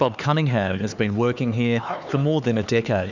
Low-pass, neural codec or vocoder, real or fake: 7.2 kHz; codec, 16 kHz, 4 kbps, X-Codec, HuBERT features, trained on LibriSpeech; fake